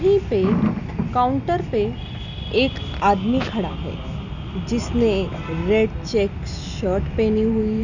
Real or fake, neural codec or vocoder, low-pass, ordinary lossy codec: real; none; 7.2 kHz; none